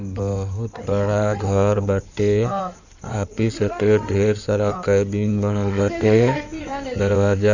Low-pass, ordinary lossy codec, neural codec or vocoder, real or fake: 7.2 kHz; none; codec, 16 kHz in and 24 kHz out, 2.2 kbps, FireRedTTS-2 codec; fake